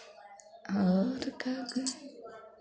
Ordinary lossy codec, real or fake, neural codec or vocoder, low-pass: none; real; none; none